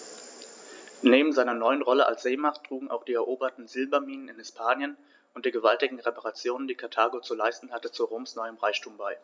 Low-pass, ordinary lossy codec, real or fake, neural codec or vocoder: none; none; real; none